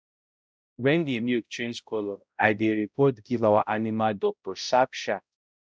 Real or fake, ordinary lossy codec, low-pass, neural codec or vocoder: fake; none; none; codec, 16 kHz, 0.5 kbps, X-Codec, HuBERT features, trained on balanced general audio